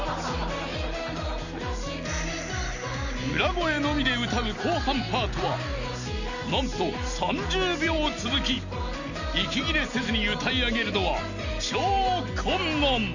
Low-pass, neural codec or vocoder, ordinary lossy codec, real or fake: 7.2 kHz; none; none; real